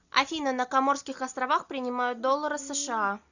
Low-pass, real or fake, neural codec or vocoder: 7.2 kHz; real; none